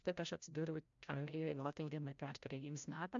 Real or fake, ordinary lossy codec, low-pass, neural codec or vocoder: fake; AAC, 64 kbps; 7.2 kHz; codec, 16 kHz, 0.5 kbps, FreqCodec, larger model